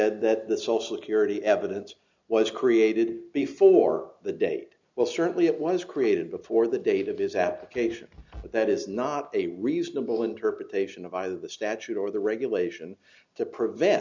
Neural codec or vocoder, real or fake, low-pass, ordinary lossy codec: none; real; 7.2 kHz; MP3, 64 kbps